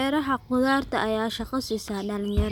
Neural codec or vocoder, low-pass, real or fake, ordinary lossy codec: none; 19.8 kHz; real; none